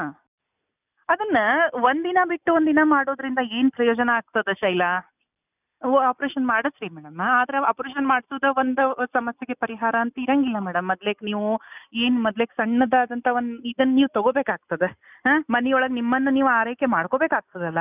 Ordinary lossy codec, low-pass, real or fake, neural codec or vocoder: none; 3.6 kHz; real; none